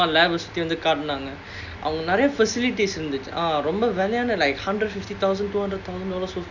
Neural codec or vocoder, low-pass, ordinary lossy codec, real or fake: none; 7.2 kHz; none; real